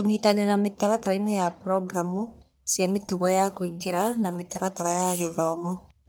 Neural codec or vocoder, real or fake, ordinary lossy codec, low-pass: codec, 44.1 kHz, 1.7 kbps, Pupu-Codec; fake; none; none